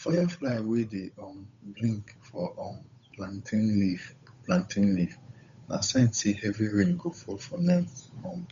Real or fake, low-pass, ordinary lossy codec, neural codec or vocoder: fake; 7.2 kHz; MP3, 64 kbps; codec, 16 kHz, 8 kbps, FunCodec, trained on Chinese and English, 25 frames a second